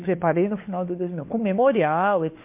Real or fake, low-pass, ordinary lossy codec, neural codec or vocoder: fake; 3.6 kHz; MP3, 24 kbps; codec, 16 kHz, 4 kbps, FunCodec, trained on LibriTTS, 50 frames a second